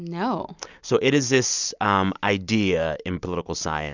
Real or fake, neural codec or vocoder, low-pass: real; none; 7.2 kHz